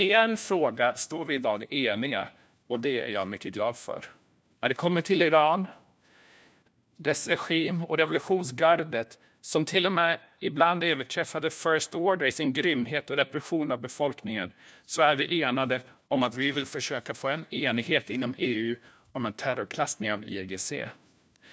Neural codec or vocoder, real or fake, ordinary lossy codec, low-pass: codec, 16 kHz, 1 kbps, FunCodec, trained on LibriTTS, 50 frames a second; fake; none; none